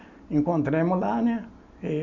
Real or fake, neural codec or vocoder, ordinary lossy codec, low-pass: real; none; none; 7.2 kHz